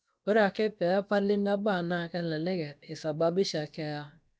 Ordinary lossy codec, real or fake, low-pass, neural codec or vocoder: none; fake; none; codec, 16 kHz, about 1 kbps, DyCAST, with the encoder's durations